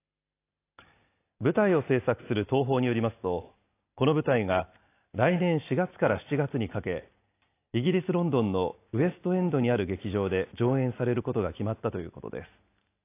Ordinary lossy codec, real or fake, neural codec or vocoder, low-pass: AAC, 24 kbps; real; none; 3.6 kHz